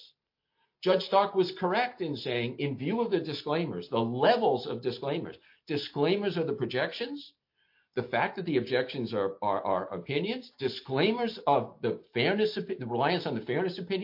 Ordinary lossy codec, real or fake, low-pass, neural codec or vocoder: MP3, 32 kbps; real; 5.4 kHz; none